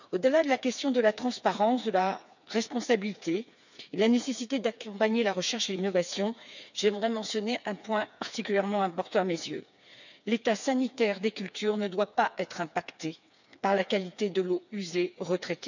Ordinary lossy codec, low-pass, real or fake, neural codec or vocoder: none; 7.2 kHz; fake; codec, 16 kHz, 4 kbps, FreqCodec, smaller model